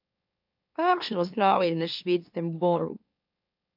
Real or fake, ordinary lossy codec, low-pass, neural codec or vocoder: fake; AAC, 48 kbps; 5.4 kHz; autoencoder, 44.1 kHz, a latent of 192 numbers a frame, MeloTTS